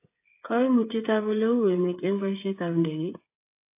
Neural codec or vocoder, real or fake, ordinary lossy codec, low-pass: codec, 16 kHz, 8 kbps, FreqCodec, smaller model; fake; AAC, 24 kbps; 3.6 kHz